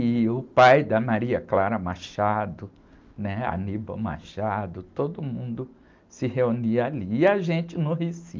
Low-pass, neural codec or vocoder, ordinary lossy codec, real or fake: 7.2 kHz; none; Opus, 32 kbps; real